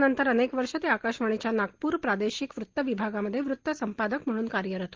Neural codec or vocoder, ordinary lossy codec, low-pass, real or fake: none; Opus, 16 kbps; 7.2 kHz; real